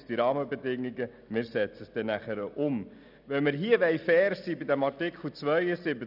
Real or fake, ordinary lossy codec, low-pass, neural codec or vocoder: real; none; 5.4 kHz; none